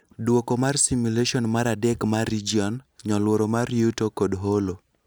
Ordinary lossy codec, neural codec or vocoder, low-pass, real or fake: none; none; none; real